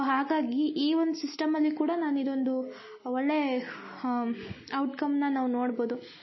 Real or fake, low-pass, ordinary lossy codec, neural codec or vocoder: real; 7.2 kHz; MP3, 24 kbps; none